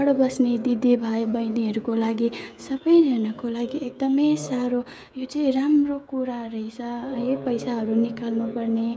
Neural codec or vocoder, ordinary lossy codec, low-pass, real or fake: codec, 16 kHz, 16 kbps, FreqCodec, smaller model; none; none; fake